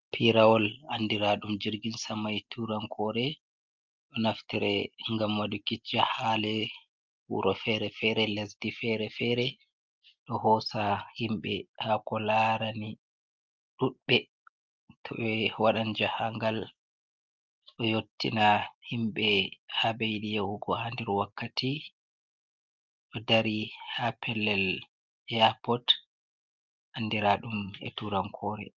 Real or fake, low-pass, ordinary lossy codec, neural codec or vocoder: real; 7.2 kHz; Opus, 24 kbps; none